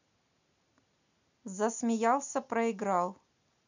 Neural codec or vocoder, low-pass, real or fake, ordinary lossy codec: none; 7.2 kHz; real; none